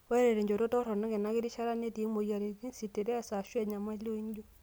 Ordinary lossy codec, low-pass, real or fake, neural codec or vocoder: none; none; real; none